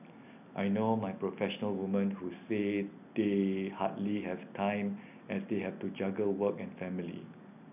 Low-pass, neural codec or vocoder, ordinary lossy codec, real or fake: 3.6 kHz; none; none; real